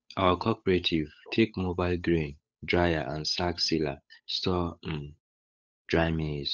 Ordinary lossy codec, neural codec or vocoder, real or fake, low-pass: none; codec, 16 kHz, 8 kbps, FunCodec, trained on Chinese and English, 25 frames a second; fake; none